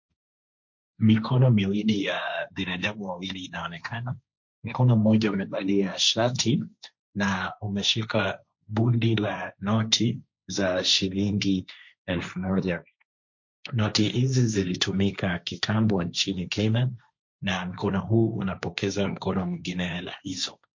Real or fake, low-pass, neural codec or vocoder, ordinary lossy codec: fake; 7.2 kHz; codec, 16 kHz, 1.1 kbps, Voila-Tokenizer; MP3, 48 kbps